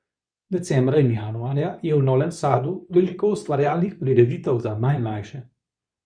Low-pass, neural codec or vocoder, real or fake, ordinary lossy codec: 9.9 kHz; codec, 24 kHz, 0.9 kbps, WavTokenizer, medium speech release version 2; fake; none